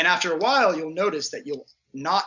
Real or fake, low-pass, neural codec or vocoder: real; 7.2 kHz; none